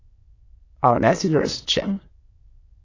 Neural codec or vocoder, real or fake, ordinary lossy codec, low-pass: autoencoder, 22.05 kHz, a latent of 192 numbers a frame, VITS, trained on many speakers; fake; AAC, 32 kbps; 7.2 kHz